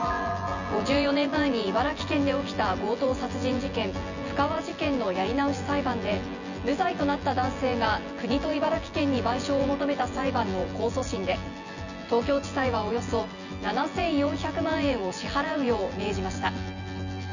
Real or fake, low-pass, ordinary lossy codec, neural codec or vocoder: fake; 7.2 kHz; none; vocoder, 24 kHz, 100 mel bands, Vocos